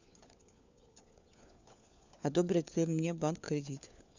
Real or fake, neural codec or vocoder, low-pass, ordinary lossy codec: fake; codec, 16 kHz, 4 kbps, FunCodec, trained on LibriTTS, 50 frames a second; 7.2 kHz; none